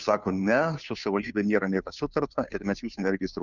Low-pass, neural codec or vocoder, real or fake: 7.2 kHz; codec, 16 kHz, 2 kbps, FunCodec, trained on Chinese and English, 25 frames a second; fake